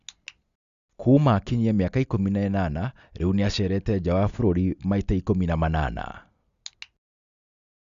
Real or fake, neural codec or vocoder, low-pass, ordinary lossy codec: real; none; 7.2 kHz; Opus, 64 kbps